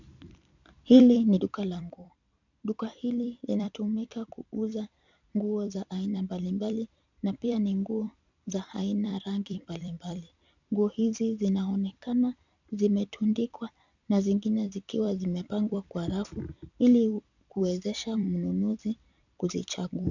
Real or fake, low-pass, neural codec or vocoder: fake; 7.2 kHz; vocoder, 44.1 kHz, 128 mel bands every 256 samples, BigVGAN v2